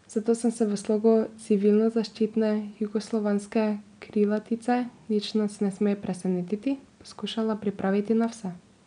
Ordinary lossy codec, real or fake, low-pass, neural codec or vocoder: none; real; 9.9 kHz; none